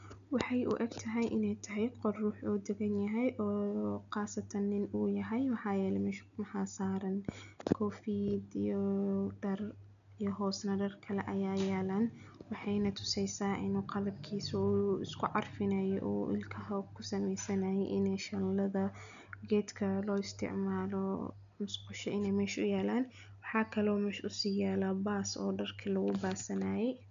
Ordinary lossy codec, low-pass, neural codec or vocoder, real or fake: none; 7.2 kHz; none; real